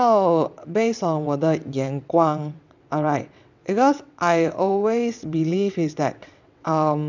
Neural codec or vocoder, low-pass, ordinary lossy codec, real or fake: vocoder, 22.05 kHz, 80 mel bands, WaveNeXt; 7.2 kHz; none; fake